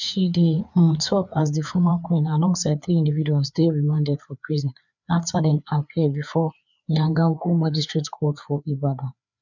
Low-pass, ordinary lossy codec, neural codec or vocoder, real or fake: 7.2 kHz; none; codec, 16 kHz, 4 kbps, FreqCodec, larger model; fake